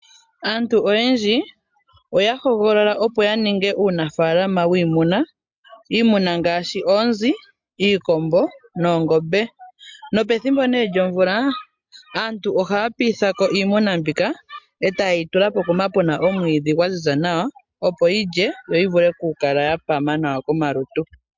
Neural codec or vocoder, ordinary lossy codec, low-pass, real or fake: none; MP3, 64 kbps; 7.2 kHz; real